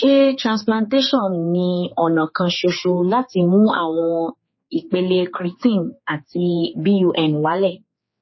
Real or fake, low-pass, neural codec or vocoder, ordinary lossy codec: fake; 7.2 kHz; codec, 16 kHz, 4 kbps, X-Codec, HuBERT features, trained on general audio; MP3, 24 kbps